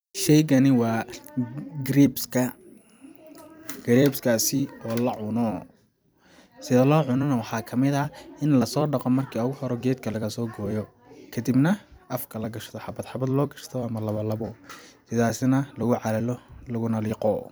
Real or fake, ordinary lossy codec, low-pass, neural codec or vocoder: fake; none; none; vocoder, 44.1 kHz, 128 mel bands every 256 samples, BigVGAN v2